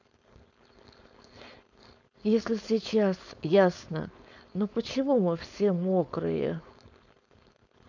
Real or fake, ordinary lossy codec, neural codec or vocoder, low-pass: fake; none; codec, 16 kHz, 4.8 kbps, FACodec; 7.2 kHz